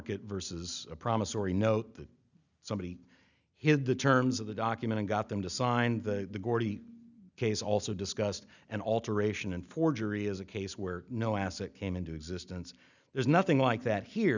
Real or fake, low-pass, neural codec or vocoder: real; 7.2 kHz; none